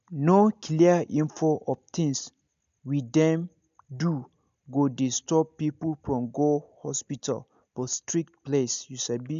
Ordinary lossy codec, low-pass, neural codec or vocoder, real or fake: MP3, 64 kbps; 7.2 kHz; none; real